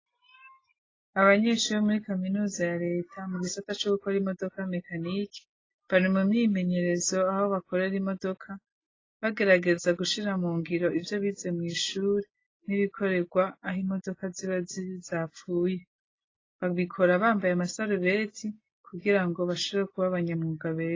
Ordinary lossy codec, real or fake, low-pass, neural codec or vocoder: AAC, 32 kbps; real; 7.2 kHz; none